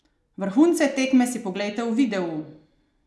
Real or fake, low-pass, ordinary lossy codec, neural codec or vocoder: real; none; none; none